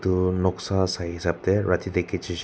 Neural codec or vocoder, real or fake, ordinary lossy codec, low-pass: none; real; none; none